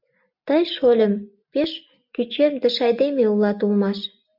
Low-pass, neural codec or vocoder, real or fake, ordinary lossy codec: 5.4 kHz; none; real; MP3, 48 kbps